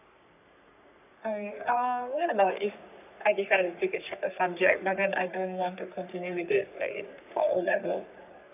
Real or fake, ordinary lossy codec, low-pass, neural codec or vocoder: fake; none; 3.6 kHz; codec, 44.1 kHz, 3.4 kbps, Pupu-Codec